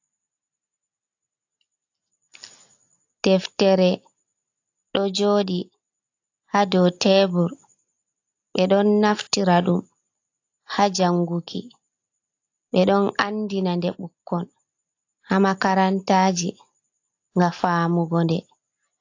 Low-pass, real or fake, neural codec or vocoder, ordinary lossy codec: 7.2 kHz; real; none; AAC, 48 kbps